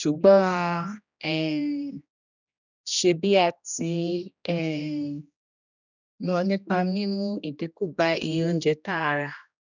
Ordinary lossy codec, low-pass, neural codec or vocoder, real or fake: none; 7.2 kHz; codec, 16 kHz, 1 kbps, X-Codec, HuBERT features, trained on general audio; fake